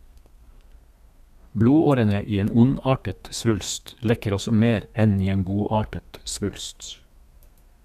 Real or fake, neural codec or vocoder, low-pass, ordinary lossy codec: fake; codec, 32 kHz, 1.9 kbps, SNAC; 14.4 kHz; none